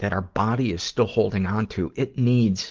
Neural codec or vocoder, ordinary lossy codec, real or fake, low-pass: none; Opus, 16 kbps; real; 7.2 kHz